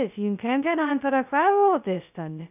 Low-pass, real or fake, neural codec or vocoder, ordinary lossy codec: 3.6 kHz; fake; codec, 16 kHz, 0.2 kbps, FocalCodec; none